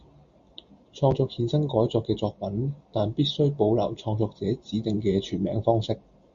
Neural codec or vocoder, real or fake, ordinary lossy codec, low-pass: none; real; Opus, 64 kbps; 7.2 kHz